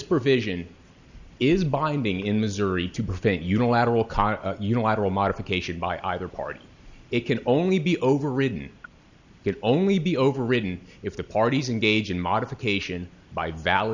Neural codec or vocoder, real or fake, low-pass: none; real; 7.2 kHz